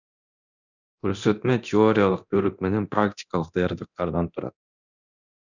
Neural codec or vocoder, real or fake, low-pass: codec, 24 kHz, 0.9 kbps, DualCodec; fake; 7.2 kHz